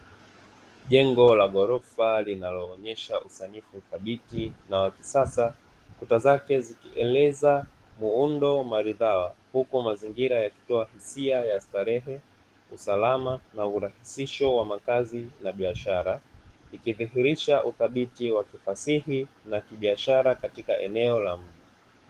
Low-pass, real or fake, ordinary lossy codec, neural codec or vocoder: 14.4 kHz; fake; Opus, 24 kbps; codec, 44.1 kHz, 7.8 kbps, DAC